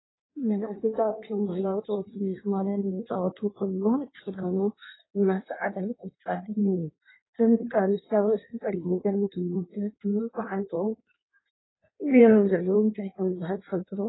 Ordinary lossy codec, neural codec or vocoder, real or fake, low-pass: AAC, 16 kbps; codec, 16 kHz in and 24 kHz out, 1.1 kbps, FireRedTTS-2 codec; fake; 7.2 kHz